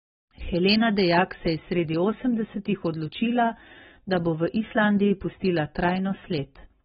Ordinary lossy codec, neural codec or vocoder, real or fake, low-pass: AAC, 16 kbps; none; real; 10.8 kHz